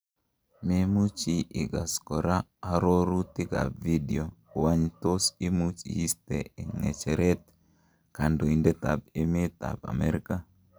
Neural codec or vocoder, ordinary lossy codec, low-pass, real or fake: none; none; none; real